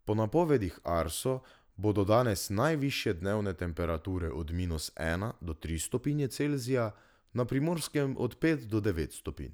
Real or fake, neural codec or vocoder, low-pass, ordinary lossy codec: real; none; none; none